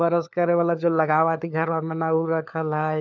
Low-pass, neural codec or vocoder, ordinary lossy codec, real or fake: 7.2 kHz; codec, 16 kHz, 4 kbps, FunCodec, trained on LibriTTS, 50 frames a second; none; fake